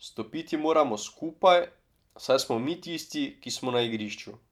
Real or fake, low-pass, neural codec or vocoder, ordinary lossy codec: fake; 19.8 kHz; vocoder, 44.1 kHz, 128 mel bands every 512 samples, BigVGAN v2; none